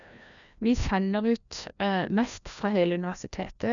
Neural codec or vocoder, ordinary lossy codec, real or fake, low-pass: codec, 16 kHz, 1 kbps, FreqCodec, larger model; none; fake; 7.2 kHz